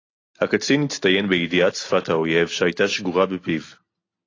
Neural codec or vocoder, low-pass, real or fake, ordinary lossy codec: none; 7.2 kHz; real; AAC, 32 kbps